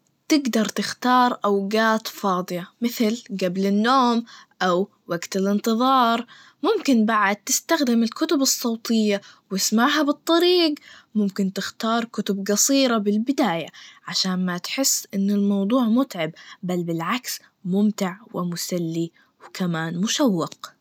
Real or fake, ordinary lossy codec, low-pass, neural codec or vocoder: real; none; 19.8 kHz; none